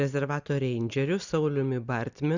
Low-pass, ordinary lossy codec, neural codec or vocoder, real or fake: 7.2 kHz; Opus, 64 kbps; none; real